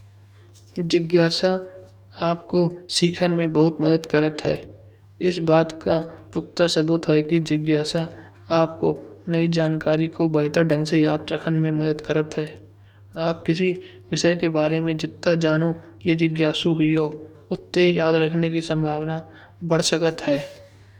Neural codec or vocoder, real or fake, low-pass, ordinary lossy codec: codec, 44.1 kHz, 2.6 kbps, DAC; fake; 19.8 kHz; none